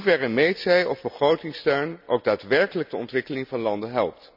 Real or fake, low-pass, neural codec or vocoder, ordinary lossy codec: real; 5.4 kHz; none; none